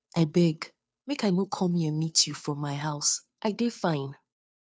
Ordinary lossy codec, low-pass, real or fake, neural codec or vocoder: none; none; fake; codec, 16 kHz, 2 kbps, FunCodec, trained on Chinese and English, 25 frames a second